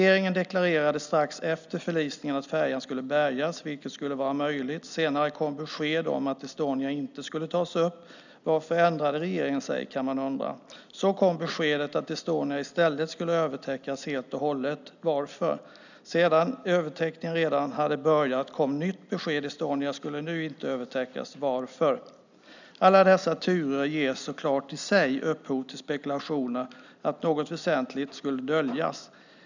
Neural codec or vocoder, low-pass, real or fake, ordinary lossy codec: none; 7.2 kHz; real; none